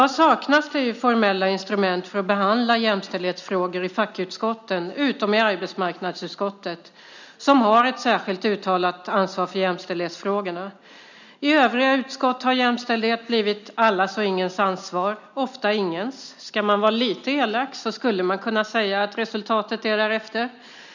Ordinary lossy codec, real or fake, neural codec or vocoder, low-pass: none; real; none; 7.2 kHz